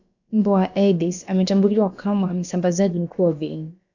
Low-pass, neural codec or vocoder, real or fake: 7.2 kHz; codec, 16 kHz, about 1 kbps, DyCAST, with the encoder's durations; fake